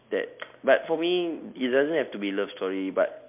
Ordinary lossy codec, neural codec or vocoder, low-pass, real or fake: MP3, 32 kbps; none; 3.6 kHz; real